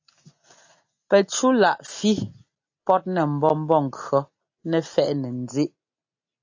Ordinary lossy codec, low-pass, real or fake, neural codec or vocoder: AAC, 48 kbps; 7.2 kHz; real; none